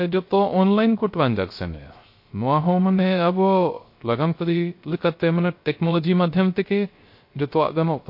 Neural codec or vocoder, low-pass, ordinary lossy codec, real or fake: codec, 16 kHz, 0.3 kbps, FocalCodec; 5.4 kHz; MP3, 32 kbps; fake